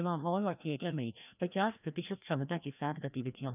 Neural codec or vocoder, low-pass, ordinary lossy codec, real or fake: codec, 16 kHz, 1 kbps, FreqCodec, larger model; 3.6 kHz; none; fake